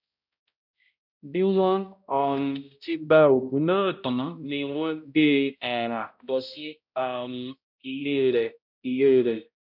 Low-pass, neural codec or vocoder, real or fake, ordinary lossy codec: 5.4 kHz; codec, 16 kHz, 0.5 kbps, X-Codec, HuBERT features, trained on balanced general audio; fake; none